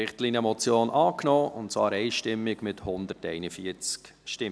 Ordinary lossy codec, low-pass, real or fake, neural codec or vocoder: none; none; real; none